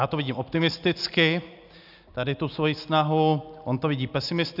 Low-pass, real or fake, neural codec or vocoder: 5.4 kHz; real; none